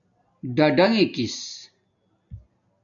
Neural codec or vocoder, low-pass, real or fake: none; 7.2 kHz; real